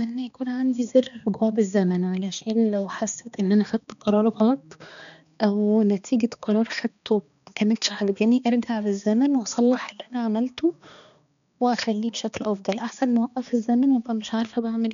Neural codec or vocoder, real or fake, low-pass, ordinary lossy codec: codec, 16 kHz, 2 kbps, X-Codec, HuBERT features, trained on balanced general audio; fake; 7.2 kHz; none